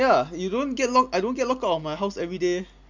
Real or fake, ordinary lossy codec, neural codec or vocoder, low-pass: real; MP3, 64 kbps; none; 7.2 kHz